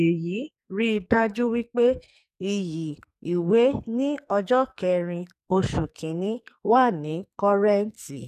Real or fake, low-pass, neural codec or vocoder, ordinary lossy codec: fake; 14.4 kHz; codec, 44.1 kHz, 2.6 kbps, SNAC; none